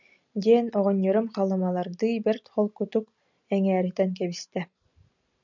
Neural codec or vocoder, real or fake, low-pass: none; real; 7.2 kHz